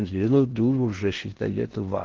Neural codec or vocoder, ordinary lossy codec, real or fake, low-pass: codec, 16 kHz in and 24 kHz out, 0.6 kbps, FocalCodec, streaming, 4096 codes; Opus, 16 kbps; fake; 7.2 kHz